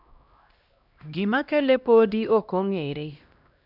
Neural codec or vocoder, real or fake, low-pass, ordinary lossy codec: codec, 16 kHz, 1 kbps, X-Codec, HuBERT features, trained on LibriSpeech; fake; 5.4 kHz; none